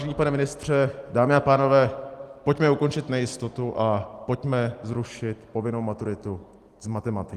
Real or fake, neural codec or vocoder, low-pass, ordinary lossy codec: real; none; 14.4 kHz; Opus, 24 kbps